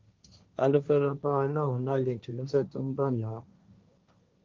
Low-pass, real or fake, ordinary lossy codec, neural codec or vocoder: 7.2 kHz; fake; Opus, 32 kbps; codec, 16 kHz, 1.1 kbps, Voila-Tokenizer